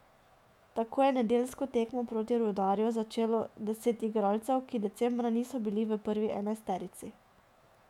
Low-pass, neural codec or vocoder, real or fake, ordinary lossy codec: 19.8 kHz; autoencoder, 48 kHz, 128 numbers a frame, DAC-VAE, trained on Japanese speech; fake; MP3, 96 kbps